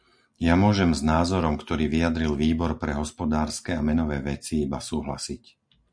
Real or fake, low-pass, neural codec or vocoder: real; 9.9 kHz; none